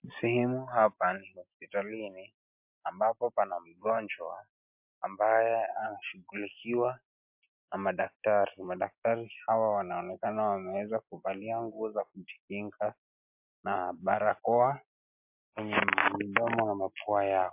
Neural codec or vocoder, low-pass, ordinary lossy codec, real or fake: none; 3.6 kHz; MP3, 32 kbps; real